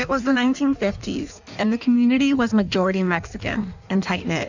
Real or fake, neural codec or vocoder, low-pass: fake; codec, 16 kHz in and 24 kHz out, 1.1 kbps, FireRedTTS-2 codec; 7.2 kHz